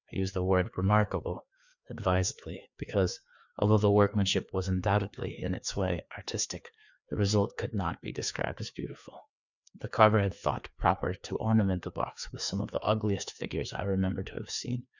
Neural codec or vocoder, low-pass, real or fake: codec, 16 kHz, 2 kbps, FreqCodec, larger model; 7.2 kHz; fake